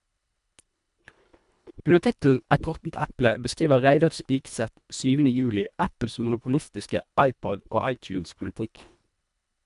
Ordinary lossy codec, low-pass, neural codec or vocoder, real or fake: MP3, 96 kbps; 10.8 kHz; codec, 24 kHz, 1.5 kbps, HILCodec; fake